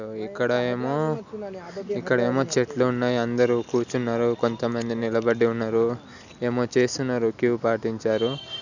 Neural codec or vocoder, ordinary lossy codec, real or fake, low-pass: none; none; real; 7.2 kHz